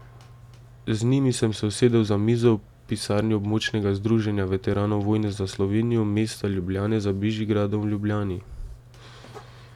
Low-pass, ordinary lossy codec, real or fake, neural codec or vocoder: 19.8 kHz; none; real; none